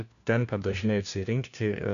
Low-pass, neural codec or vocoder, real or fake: 7.2 kHz; codec, 16 kHz, 1 kbps, FunCodec, trained on LibriTTS, 50 frames a second; fake